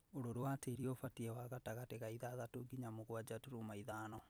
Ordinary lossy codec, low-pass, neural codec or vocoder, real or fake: none; none; vocoder, 44.1 kHz, 128 mel bands every 256 samples, BigVGAN v2; fake